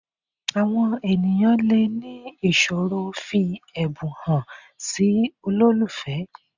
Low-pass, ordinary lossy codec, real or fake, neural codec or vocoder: 7.2 kHz; none; real; none